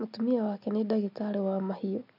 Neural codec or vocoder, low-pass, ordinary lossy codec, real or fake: none; 5.4 kHz; none; real